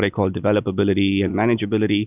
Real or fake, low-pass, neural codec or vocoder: real; 3.6 kHz; none